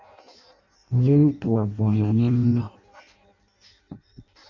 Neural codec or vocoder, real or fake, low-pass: codec, 16 kHz in and 24 kHz out, 0.6 kbps, FireRedTTS-2 codec; fake; 7.2 kHz